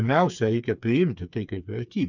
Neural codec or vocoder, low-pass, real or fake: codec, 16 kHz, 4 kbps, FreqCodec, smaller model; 7.2 kHz; fake